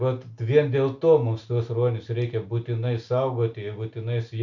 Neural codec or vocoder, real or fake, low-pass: none; real; 7.2 kHz